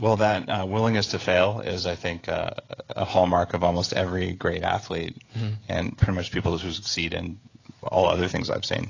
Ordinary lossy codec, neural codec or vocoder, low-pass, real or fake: AAC, 32 kbps; codec, 16 kHz, 16 kbps, FreqCodec, smaller model; 7.2 kHz; fake